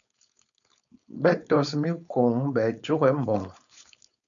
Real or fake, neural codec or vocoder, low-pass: fake; codec, 16 kHz, 4.8 kbps, FACodec; 7.2 kHz